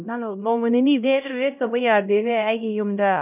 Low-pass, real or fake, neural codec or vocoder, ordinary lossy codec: 3.6 kHz; fake; codec, 16 kHz, 0.5 kbps, X-Codec, HuBERT features, trained on LibriSpeech; none